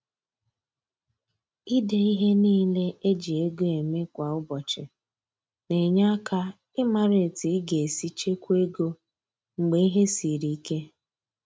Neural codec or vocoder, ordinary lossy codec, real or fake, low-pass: none; none; real; none